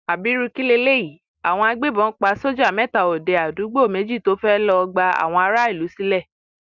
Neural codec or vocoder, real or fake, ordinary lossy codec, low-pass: none; real; Opus, 64 kbps; 7.2 kHz